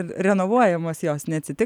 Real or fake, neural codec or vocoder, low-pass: real; none; 19.8 kHz